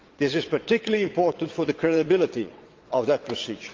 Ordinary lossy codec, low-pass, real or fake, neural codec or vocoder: Opus, 32 kbps; 7.2 kHz; fake; vocoder, 22.05 kHz, 80 mel bands, WaveNeXt